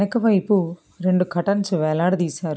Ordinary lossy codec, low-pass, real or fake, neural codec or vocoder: none; none; real; none